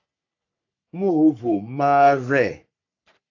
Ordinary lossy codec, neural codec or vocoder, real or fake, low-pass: AAC, 48 kbps; codec, 44.1 kHz, 3.4 kbps, Pupu-Codec; fake; 7.2 kHz